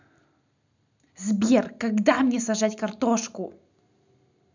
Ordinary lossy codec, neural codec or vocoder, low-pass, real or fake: none; none; 7.2 kHz; real